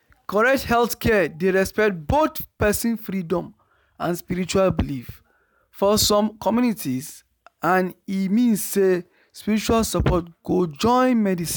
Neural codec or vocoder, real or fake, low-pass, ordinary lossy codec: none; real; none; none